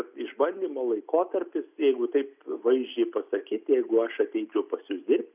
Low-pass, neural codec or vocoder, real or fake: 3.6 kHz; none; real